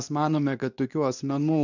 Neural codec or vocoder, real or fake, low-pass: codec, 24 kHz, 0.9 kbps, WavTokenizer, medium speech release version 2; fake; 7.2 kHz